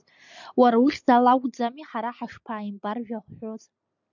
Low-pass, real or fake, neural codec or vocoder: 7.2 kHz; real; none